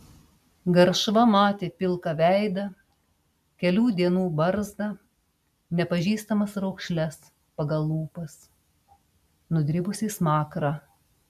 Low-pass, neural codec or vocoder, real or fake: 14.4 kHz; none; real